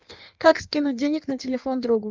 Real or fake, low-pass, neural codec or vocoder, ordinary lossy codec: fake; 7.2 kHz; codec, 16 kHz in and 24 kHz out, 1.1 kbps, FireRedTTS-2 codec; Opus, 24 kbps